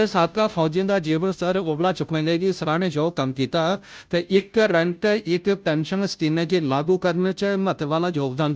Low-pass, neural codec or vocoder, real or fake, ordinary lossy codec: none; codec, 16 kHz, 0.5 kbps, FunCodec, trained on Chinese and English, 25 frames a second; fake; none